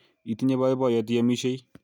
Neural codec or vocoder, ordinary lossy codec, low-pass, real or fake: none; none; 19.8 kHz; real